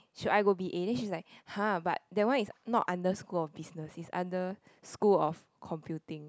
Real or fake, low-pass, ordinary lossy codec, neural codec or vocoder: real; none; none; none